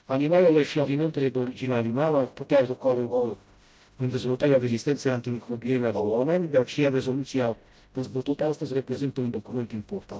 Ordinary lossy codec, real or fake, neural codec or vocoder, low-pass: none; fake; codec, 16 kHz, 0.5 kbps, FreqCodec, smaller model; none